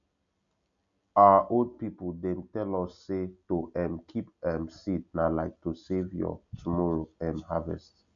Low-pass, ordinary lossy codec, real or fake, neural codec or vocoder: 7.2 kHz; none; real; none